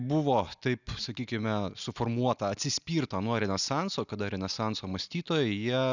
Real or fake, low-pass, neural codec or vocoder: real; 7.2 kHz; none